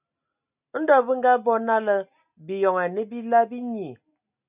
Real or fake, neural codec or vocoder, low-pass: real; none; 3.6 kHz